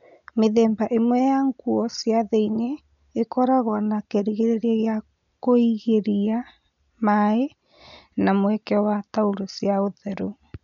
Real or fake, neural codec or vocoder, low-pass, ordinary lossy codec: real; none; 7.2 kHz; none